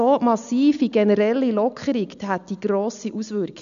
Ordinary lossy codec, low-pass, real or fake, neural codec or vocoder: none; 7.2 kHz; real; none